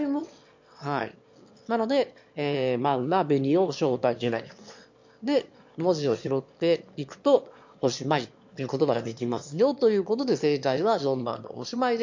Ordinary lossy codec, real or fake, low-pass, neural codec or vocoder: MP3, 48 kbps; fake; 7.2 kHz; autoencoder, 22.05 kHz, a latent of 192 numbers a frame, VITS, trained on one speaker